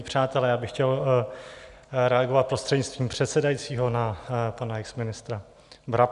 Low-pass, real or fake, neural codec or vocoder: 10.8 kHz; real; none